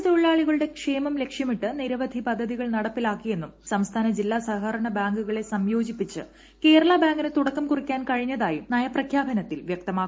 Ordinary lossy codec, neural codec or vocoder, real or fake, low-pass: none; none; real; none